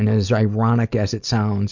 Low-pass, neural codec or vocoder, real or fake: 7.2 kHz; none; real